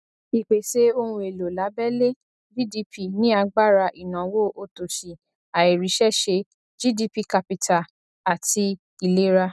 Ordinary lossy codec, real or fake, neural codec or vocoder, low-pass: none; real; none; none